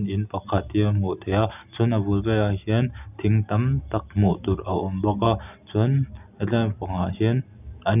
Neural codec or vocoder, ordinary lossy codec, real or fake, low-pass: none; none; real; 3.6 kHz